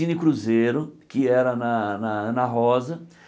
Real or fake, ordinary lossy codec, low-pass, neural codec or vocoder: real; none; none; none